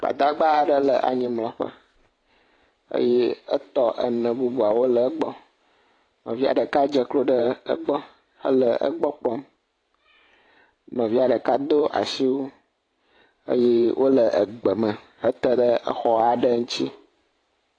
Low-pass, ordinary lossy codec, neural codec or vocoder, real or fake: 9.9 kHz; AAC, 32 kbps; vocoder, 44.1 kHz, 128 mel bands every 512 samples, BigVGAN v2; fake